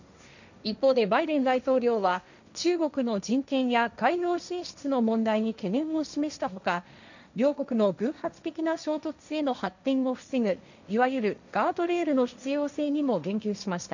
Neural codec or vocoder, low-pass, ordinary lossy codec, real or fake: codec, 16 kHz, 1.1 kbps, Voila-Tokenizer; 7.2 kHz; none; fake